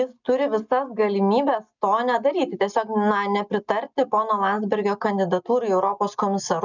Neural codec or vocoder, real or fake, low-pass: none; real; 7.2 kHz